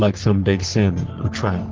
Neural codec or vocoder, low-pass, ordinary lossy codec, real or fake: codec, 44.1 kHz, 3.4 kbps, Pupu-Codec; 7.2 kHz; Opus, 16 kbps; fake